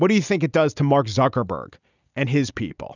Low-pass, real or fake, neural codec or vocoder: 7.2 kHz; real; none